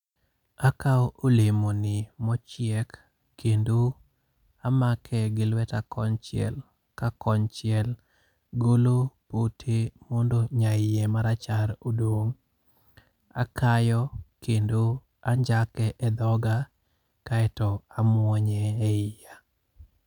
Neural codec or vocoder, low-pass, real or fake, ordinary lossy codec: none; 19.8 kHz; real; none